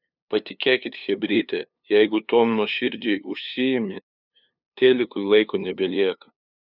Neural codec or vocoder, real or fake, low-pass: codec, 16 kHz, 2 kbps, FunCodec, trained on LibriTTS, 25 frames a second; fake; 5.4 kHz